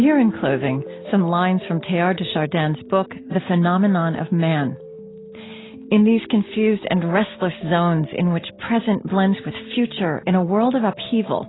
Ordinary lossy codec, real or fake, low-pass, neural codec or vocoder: AAC, 16 kbps; real; 7.2 kHz; none